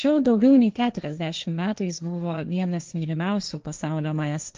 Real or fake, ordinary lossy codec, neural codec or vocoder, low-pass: fake; Opus, 24 kbps; codec, 16 kHz, 1.1 kbps, Voila-Tokenizer; 7.2 kHz